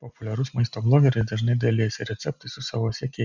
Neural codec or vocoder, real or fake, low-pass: none; real; 7.2 kHz